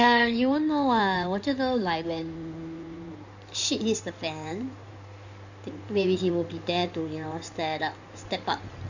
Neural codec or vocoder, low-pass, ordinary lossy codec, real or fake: codec, 16 kHz in and 24 kHz out, 2.2 kbps, FireRedTTS-2 codec; 7.2 kHz; none; fake